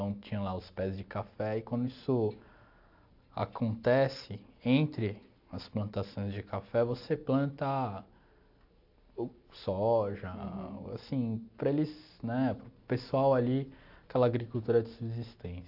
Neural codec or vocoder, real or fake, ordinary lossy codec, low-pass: none; real; AAC, 48 kbps; 5.4 kHz